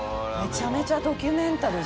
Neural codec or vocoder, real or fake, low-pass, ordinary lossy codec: none; real; none; none